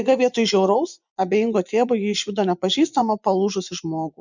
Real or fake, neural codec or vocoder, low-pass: fake; vocoder, 24 kHz, 100 mel bands, Vocos; 7.2 kHz